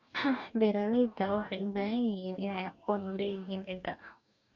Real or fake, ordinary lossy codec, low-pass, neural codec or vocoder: fake; MP3, 48 kbps; 7.2 kHz; codec, 44.1 kHz, 2.6 kbps, DAC